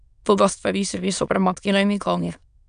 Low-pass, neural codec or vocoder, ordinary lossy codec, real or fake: 9.9 kHz; autoencoder, 22.05 kHz, a latent of 192 numbers a frame, VITS, trained on many speakers; none; fake